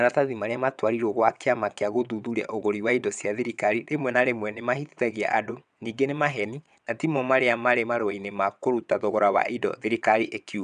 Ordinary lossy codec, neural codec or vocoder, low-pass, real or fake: none; vocoder, 22.05 kHz, 80 mel bands, WaveNeXt; 9.9 kHz; fake